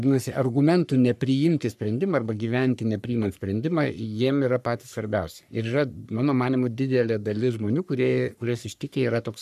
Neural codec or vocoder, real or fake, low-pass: codec, 44.1 kHz, 3.4 kbps, Pupu-Codec; fake; 14.4 kHz